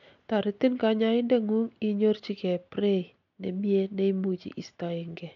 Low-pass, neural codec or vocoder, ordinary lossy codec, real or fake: 7.2 kHz; none; none; real